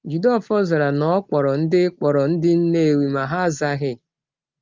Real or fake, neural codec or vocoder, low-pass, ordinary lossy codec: real; none; 7.2 kHz; Opus, 32 kbps